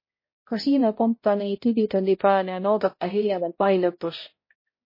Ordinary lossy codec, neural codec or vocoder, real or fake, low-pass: MP3, 24 kbps; codec, 16 kHz, 0.5 kbps, X-Codec, HuBERT features, trained on balanced general audio; fake; 5.4 kHz